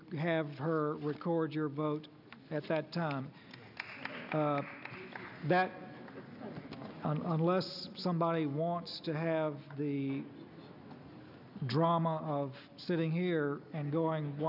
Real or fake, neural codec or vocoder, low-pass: real; none; 5.4 kHz